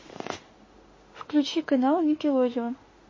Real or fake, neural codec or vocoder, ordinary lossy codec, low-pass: fake; autoencoder, 48 kHz, 32 numbers a frame, DAC-VAE, trained on Japanese speech; MP3, 32 kbps; 7.2 kHz